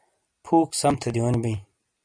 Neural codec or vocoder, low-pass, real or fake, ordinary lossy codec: none; 9.9 kHz; real; MP3, 96 kbps